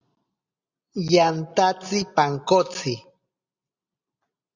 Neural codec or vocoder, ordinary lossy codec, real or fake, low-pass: none; Opus, 64 kbps; real; 7.2 kHz